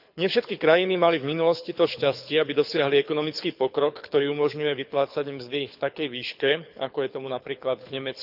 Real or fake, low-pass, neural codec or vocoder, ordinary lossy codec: fake; 5.4 kHz; codec, 24 kHz, 6 kbps, HILCodec; none